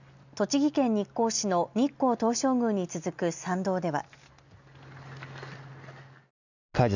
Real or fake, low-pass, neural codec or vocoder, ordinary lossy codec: real; 7.2 kHz; none; none